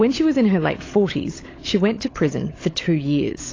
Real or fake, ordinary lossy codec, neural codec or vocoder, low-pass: fake; AAC, 32 kbps; codec, 16 kHz, 16 kbps, FunCodec, trained on LibriTTS, 50 frames a second; 7.2 kHz